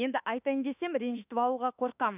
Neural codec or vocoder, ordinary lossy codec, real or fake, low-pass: autoencoder, 48 kHz, 32 numbers a frame, DAC-VAE, trained on Japanese speech; none; fake; 3.6 kHz